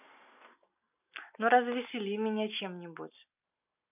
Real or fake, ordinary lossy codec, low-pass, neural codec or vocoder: real; none; 3.6 kHz; none